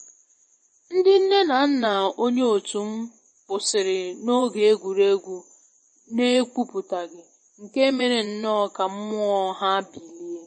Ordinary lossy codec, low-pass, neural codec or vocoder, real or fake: MP3, 32 kbps; 10.8 kHz; vocoder, 24 kHz, 100 mel bands, Vocos; fake